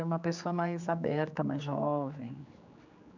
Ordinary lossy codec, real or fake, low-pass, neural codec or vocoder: none; fake; 7.2 kHz; codec, 16 kHz, 4 kbps, X-Codec, HuBERT features, trained on general audio